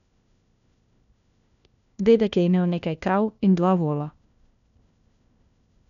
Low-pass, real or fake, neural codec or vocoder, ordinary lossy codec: 7.2 kHz; fake; codec, 16 kHz, 1 kbps, FunCodec, trained on LibriTTS, 50 frames a second; none